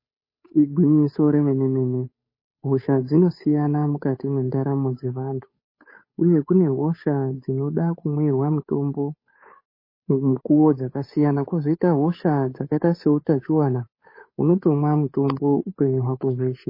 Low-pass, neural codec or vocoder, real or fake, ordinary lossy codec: 5.4 kHz; codec, 16 kHz, 8 kbps, FunCodec, trained on Chinese and English, 25 frames a second; fake; MP3, 24 kbps